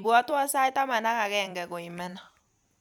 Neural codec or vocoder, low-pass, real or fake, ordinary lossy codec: vocoder, 44.1 kHz, 128 mel bands every 256 samples, BigVGAN v2; 19.8 kHz; fake; none